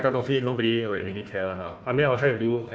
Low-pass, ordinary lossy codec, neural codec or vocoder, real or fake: none; none; codec, 16 kHz, 1 kbps, FunCodec, trained on Chinese and English, 50 frames a second; fake